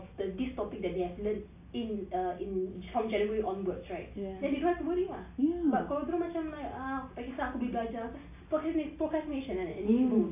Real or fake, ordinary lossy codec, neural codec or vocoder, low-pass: real; AAC, 24 kbps; none; 3.6 kHz